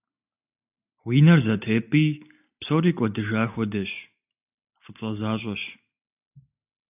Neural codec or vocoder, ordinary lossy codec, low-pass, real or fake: none; AAC, 32 kbps; 3.6 kHz; real